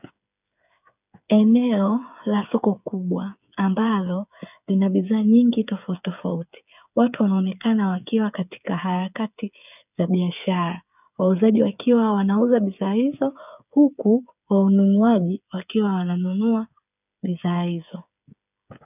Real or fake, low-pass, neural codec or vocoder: fake; 3.6 kHz; codec, 16 kHz, 8 kbps, FreqCodec, smaller model